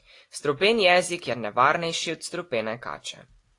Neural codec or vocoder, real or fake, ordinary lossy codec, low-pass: none; real; AAC, 48 kbps; 10.8 kHz